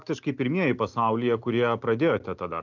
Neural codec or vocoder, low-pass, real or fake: none; 7.2 kHz; real